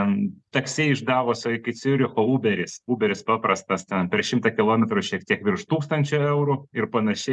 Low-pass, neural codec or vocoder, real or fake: 10.8 kHz; none; real